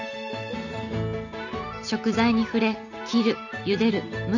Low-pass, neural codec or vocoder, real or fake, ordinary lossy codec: 7.2 kHz; none; real; none